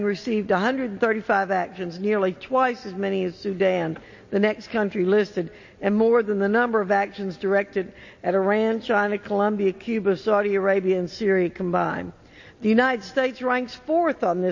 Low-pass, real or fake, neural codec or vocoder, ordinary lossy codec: 7.2 kHz; real; none; MP3, 32 kbps